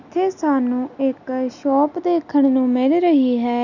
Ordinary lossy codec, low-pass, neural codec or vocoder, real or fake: none; 7.2 kHz; none; real